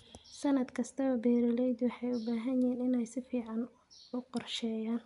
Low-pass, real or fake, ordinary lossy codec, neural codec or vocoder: 10.8 kHz; real; none; none